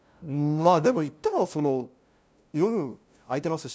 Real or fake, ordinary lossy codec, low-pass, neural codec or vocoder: fake; none; none; codec, 16 kHz, 0.5 kbps, FunCodec, trained on LibriTTS, 25 frames a second